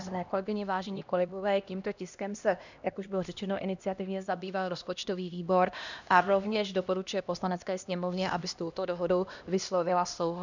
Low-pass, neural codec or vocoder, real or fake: 7.2 kHz; codec, 16 kHz, 1 kbps, X-Codec, HuBERT features, trained on LibriSpeech; fake